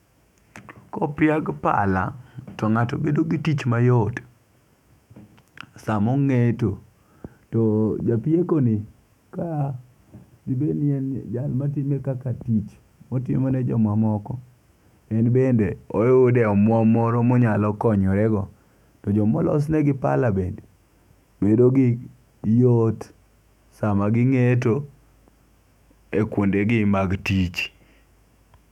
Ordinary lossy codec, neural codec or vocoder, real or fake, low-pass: none; autoencoder, 48 kHz, 128 numbers a frame, DAC-VAE, trained on Japanese speech; fake; 19.8 kHz